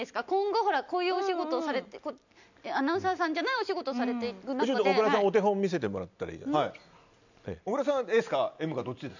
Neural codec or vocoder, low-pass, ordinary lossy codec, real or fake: none; 7.2 kHz; none; real